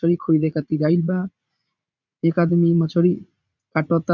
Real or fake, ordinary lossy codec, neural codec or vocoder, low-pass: fake; none; vocoder, 44.1 kHz, 80 mel bands, Vocos; 7.2 kHz